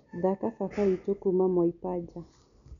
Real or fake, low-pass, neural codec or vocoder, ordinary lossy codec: real; 7.2 kHz; none; none